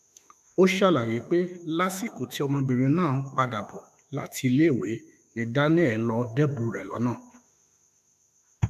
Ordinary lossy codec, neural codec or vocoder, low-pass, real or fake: none; autoencoder, 48 kHz, 32 numbers a frame, DAC-VAE, trained on Japanese speech; 14.4 kHz; fake